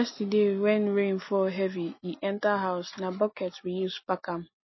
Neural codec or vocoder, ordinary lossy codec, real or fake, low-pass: none; MP3, 24 kbps; real; 7.2 kHz